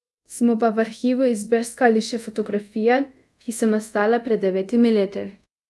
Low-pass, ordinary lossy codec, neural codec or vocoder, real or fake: none; none; codec, 24 kHz, 0.5 kbps, DualCodec; fake